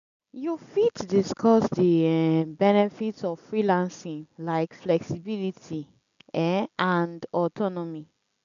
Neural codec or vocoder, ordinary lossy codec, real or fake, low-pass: none; none; real; 7.2 kHz